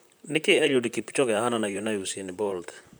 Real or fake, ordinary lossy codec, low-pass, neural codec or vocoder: fake; none; none; vocoder, 44.1 kHz, 128 mel bands, Pupu-Vocoder